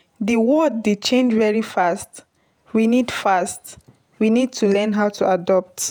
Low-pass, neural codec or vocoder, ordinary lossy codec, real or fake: none; vocoder, 48 kHz, 128 mel bands, Vocos; none; fake